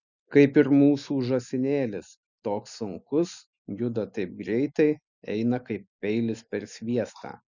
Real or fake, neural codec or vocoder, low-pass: real; none; 7.2 kHz